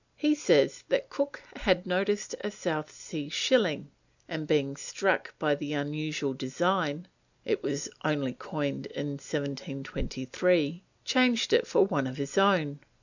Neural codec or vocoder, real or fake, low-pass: none; real; 7.2 kHz